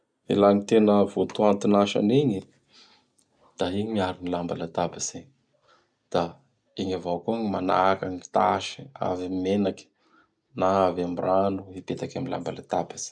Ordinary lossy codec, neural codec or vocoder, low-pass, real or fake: none; none; 9.9 kHz; real